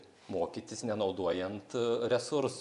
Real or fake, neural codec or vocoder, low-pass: fake; vocoder, 24 kHz, 100 mel bands, Vocos; 10.8 kHz